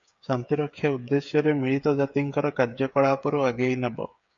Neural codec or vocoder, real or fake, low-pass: codec, 16 kHz, 8 kbps, FreqCodec, smaller model; fake; 7.2 kHz